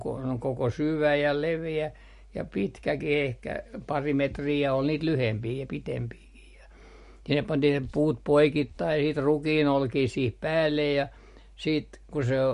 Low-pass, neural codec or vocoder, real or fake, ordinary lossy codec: 14.4 kHz; none; real; MP3, 48 kbps